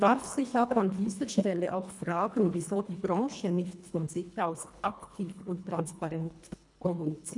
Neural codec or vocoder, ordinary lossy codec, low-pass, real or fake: codec, 24 kHz, 1.5 kbps, HILCodec; none; 10.8 kHz; fake